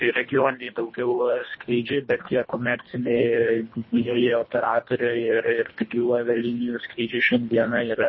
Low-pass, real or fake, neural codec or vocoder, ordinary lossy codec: 7.2 kHz; fake; codec, 24 kHz, 1.5 kbps, HILCodec; MP3, 24 kbps